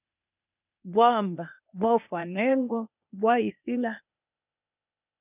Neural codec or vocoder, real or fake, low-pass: codec, 16 kHz, 0.8 kbps, ZipCodec; fake; 3.6 kHz